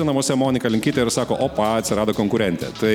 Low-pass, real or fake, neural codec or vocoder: 19.8 kHz; real; none